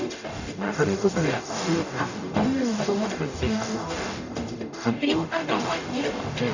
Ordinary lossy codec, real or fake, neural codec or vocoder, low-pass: none; fake; codec, 44.1 kHz, 0.9 kbps, DAC; 7.2 kHz